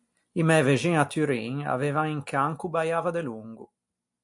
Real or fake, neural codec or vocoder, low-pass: real; none; 10.8 kHz